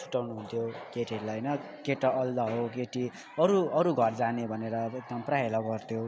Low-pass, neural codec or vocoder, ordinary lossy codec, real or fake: none; none; none; real